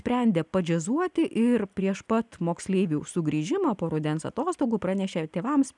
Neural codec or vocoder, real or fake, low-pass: none; real; 10.8 kHz